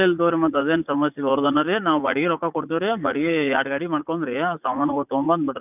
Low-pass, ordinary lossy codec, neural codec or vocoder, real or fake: 3.6 kHz; none; vocoder, 44.1 kHz, 80 mel bands, Vocos; fake